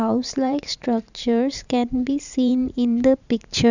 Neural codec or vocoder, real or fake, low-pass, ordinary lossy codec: vocoder, 44.1 kHz, 128 mel bands every 512 samples, BigVGAN v2; fake; 7.2 kHz; none